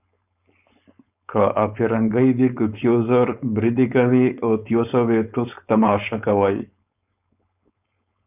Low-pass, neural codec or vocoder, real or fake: 3.6 kHz; codec, 16 kHz, 4.8 kbps, FACodec; fake